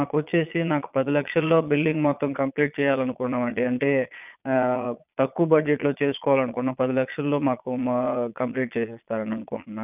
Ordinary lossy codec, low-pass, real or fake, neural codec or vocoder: none; 3.6 kHz; fake; vocoder, 22.05 kHz, 80 mel bands, Vocos